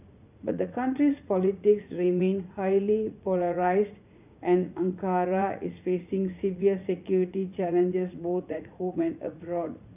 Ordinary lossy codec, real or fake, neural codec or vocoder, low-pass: none; fake; vocoder, 44.1 kHz, 80 mel bands, Vocos; 3.6 kHz